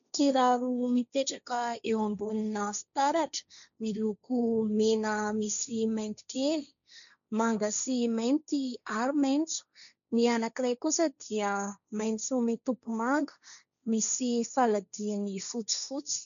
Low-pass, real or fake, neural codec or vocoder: 7.2 kHz; fake; codec, 16 kHz, 1.1 kbps, Voila-Tokenizer